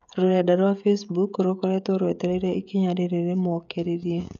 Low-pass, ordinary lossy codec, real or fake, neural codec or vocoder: 7.2 kHz; none; fake; codec, 16 kHz, 16 kbps, FreqCodec, smaller model